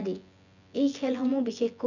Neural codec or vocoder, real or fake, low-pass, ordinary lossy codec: vocoder, 24 kHz, 100 mel bands, Vocos; fake; 7.2 kHz; none